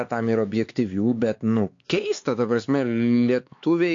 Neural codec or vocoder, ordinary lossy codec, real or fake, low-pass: codec, 16 kHz, 4 kbps, X-Codec, HuBERT features, trained on LibriSpeech; AAC, 48 kbps; fake; 7.2 kHz